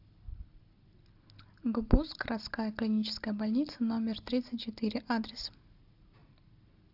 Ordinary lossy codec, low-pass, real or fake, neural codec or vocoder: AAC, 48 kbps; 5.4 kHz; real; none